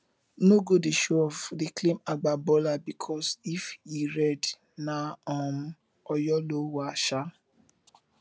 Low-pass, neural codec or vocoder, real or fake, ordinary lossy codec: none; none; real; none